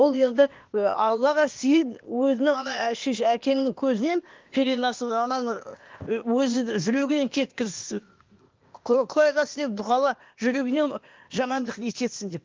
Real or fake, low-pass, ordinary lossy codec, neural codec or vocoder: fake; 7.2 kHz; Opus, 32 kbps; codec, 16 kHz, 0.8 kbps, ZipCodec